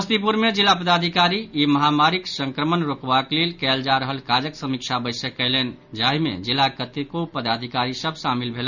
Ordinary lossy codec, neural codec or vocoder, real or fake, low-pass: none; none; real; 7.2 kHz